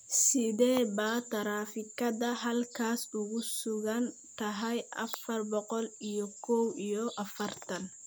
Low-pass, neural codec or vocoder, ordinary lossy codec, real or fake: none; none; none; real